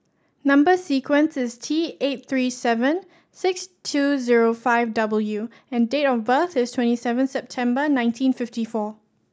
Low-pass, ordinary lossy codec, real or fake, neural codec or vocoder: none; none; real; none